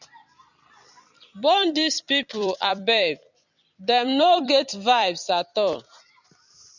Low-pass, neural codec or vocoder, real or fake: 7.2 kHz; vocoder, 44.1 kHz, 128 mel bands every 256 samples, BigVGAN v2; fake